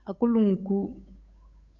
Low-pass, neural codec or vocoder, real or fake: 7.2 kHz; codec, 16 kHz, 8 kbps, FunCodec, trained on Chinese and English, 25 frames a second; fake